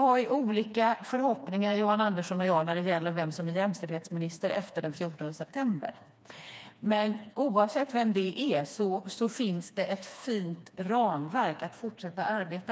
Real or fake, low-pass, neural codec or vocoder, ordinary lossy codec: fake; none; codec, 16 kHz, 2 kbps, FreqCodec, smaller model; none